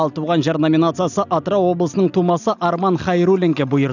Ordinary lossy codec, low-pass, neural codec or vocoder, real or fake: none; 7.2 kHz; none; real